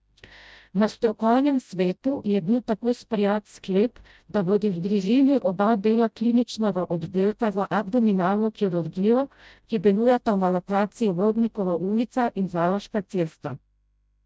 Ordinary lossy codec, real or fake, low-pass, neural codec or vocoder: none; fake; none; codec, 16 kHz, 0.5 kbps, FreqCodec, smaller model